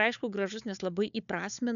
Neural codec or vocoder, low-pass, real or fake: codec, 16 kHz, 4 kbps, FunCodec, trained on Chinese and English, 50 frames a second; 7.2 kHz; fake